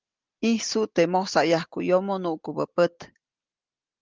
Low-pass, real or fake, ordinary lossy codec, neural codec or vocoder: 7.2 kHz; real; Opus, 32 kbps; none